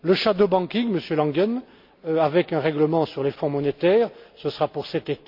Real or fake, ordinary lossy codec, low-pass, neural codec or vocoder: real; MP3, 48 kbps; 5.4 kHz; none